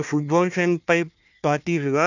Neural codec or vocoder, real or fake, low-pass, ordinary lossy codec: codec, 16 kHz, 1 kbps, FunCodec, trained on Chinese and English, 50 frames a second; fake; 7.2 kHz; none